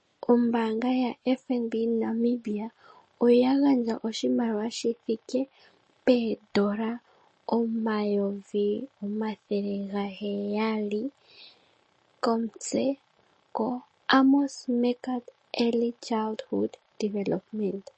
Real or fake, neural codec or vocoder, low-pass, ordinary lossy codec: real; none; 10.8 kHz; MP3, 32 kbps